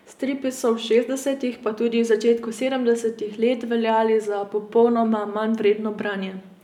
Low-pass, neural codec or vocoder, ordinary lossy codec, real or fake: 19.8 kHz; none; none; real